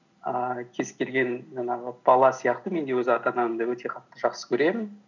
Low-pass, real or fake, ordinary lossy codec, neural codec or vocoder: 7.2 kHz; real; none; none